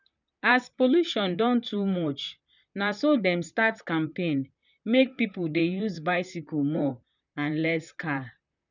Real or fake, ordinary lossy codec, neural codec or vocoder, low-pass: fake; none; vocoder, 44.1 kHz, 128 mel bands, Pupu-Vocoder; 7.2 kHz